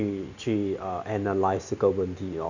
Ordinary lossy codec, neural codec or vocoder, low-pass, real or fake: none; none; 7.2 kHz; real